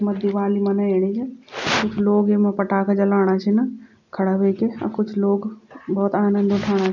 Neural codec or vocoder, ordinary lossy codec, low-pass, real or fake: none; none; 7.2 kHz; real